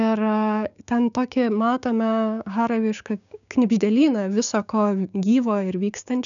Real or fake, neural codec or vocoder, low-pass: fake; codec, 16 kHz, 6 kbps, DAC; 7.2 kHz